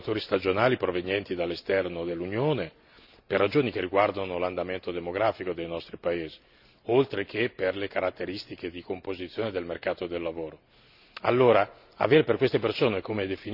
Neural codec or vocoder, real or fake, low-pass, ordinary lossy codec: none; real; 5.4 kHz; none